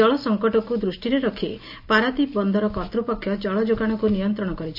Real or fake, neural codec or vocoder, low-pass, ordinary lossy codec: real; none; 5.4 kHz; AAC, 48 kbps